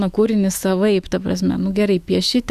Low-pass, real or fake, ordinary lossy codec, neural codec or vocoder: 14.4 kHz; fake; Opus, 64 kbps; autoencoder, 48 kHz, 128 numbers a frame, DAC-VAE, trained on Japanese speech